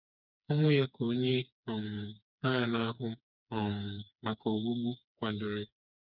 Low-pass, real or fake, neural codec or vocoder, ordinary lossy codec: 5.4 kHz; fake; codec, 16 kHz, 4 kbps, FreqCodec, smaller model; none